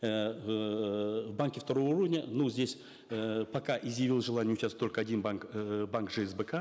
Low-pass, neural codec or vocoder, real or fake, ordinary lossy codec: none; none; real; none